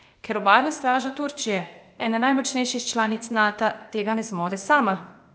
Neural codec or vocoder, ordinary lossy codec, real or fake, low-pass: codec, 16 kHz, 0.8 kbps, ZipCodec; none; fake; none